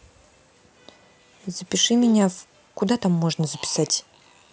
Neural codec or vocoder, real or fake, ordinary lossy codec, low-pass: none; real; none; none